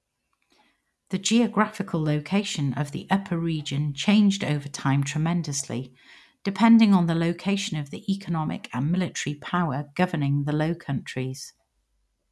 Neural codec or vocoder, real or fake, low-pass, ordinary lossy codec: none; real; none; none